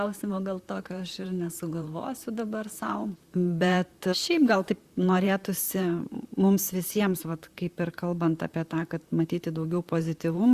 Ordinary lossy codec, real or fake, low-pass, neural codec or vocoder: Opus, 64 kbps; fake; 14.4 kHz; vocoder, 44.1 kHz, 128 mel bands, Pupu-Vocoder